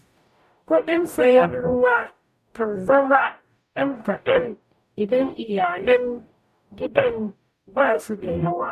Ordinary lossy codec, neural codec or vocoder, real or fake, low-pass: none; codec, 44.1 kHz, 0.9 kbps, DAC; fake; 14.4 kHz